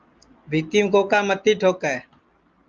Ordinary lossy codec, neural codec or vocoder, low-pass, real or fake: Opus, 24 kbps; none; 7.2 kHz; real